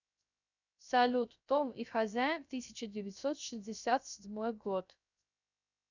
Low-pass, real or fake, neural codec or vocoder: 7.2 kHz; fake; codec, 16 kHz, 0.3 kbps, FocalCodec